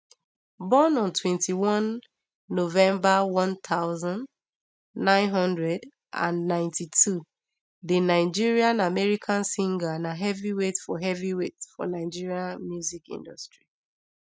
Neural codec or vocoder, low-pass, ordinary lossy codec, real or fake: none; none; none; real